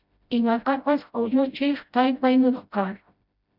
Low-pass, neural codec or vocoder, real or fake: 5.4 kHz; codec, 16 kHz, 0.5 kbps, FreqCodec, smaller model; fake